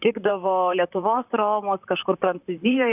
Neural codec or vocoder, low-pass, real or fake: vocoder, 44.1 kHz, 128 mel bands, Pupu-Vocoder; 3.6 kHz; fake